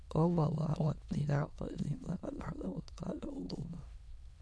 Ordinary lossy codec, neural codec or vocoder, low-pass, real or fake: none; autoencoder, 22.05 kHz, a latent of 192 numbers a frame, VITS, trained on many speakers; none; fake